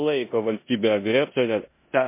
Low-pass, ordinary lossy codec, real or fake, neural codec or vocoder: 3.6 kHz; MP3, 24 kbps; fake; codec, 16 kHz in and 24 kHz out, 0.9 kbps, LongCat-Audio-Codec, four codebook decoder